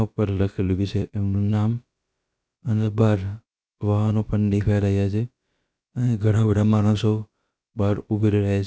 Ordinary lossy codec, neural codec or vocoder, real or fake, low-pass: none; codec, 16 kHz, about 1 kbps, DyCAST, with the encoder's durations; fake; none